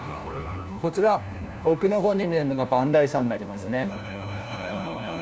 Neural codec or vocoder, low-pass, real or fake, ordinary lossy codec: codec, 16 kHz, 1 kbps, FunCodec, trained on LibriTTS, 50 frames a second; none; fake; none